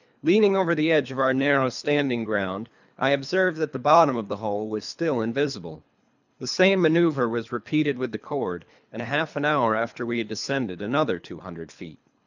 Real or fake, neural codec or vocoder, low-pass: fake; codec, 24 kHz, 3 kbps, HILCodec; 7.2 kHz